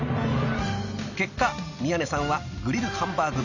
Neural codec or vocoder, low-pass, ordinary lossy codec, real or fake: none; 7.2 kHz; none; real